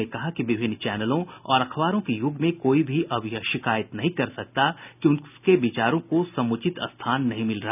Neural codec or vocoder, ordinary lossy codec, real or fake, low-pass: none; none; real; 3.6 kHz